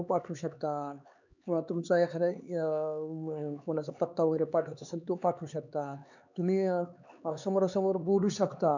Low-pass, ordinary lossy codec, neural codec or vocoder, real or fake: 7.2 kHz; none; codec, 16 kHz, 4 kbps, X-Codec, HuBERT features, trained on LibriSpeech; fake